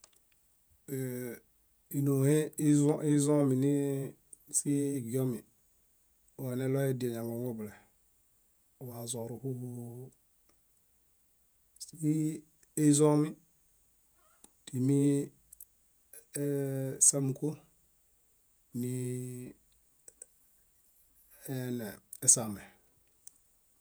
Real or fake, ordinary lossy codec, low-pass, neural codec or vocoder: fake; none; none; vocoder, 48 kHz, 128 mel bands, Vocos